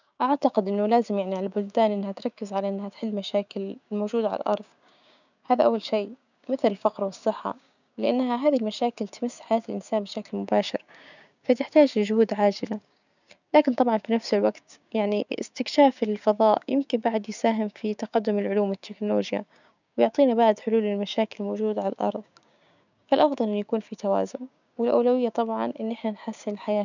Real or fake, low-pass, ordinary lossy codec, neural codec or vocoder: fake; 7.2 kHz; none; codec, 16 kHz, 6 kbps, DAC